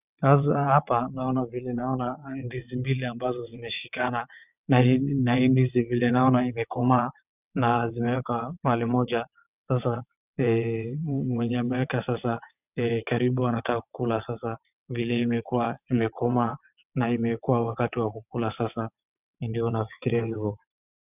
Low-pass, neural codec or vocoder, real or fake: 3.6 kHz; vocoder, 22.05 kHz, 80 mel bands, WaveNeXt; fake